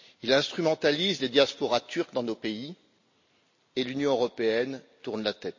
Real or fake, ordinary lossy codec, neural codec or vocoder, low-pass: real; MP3, 32 kbps; none; 7.2 kHz